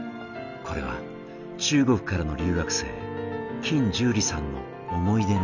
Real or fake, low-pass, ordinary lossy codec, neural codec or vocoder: real; 7.2 kHz; none; none